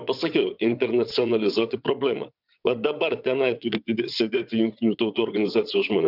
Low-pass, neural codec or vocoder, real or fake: 5.4 kHz; vocoder, 22.05 kHz, 80 mel bands, WaveNeXt; fake